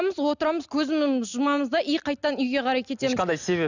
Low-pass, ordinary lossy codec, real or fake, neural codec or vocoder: 7.2 kHz; none; real; none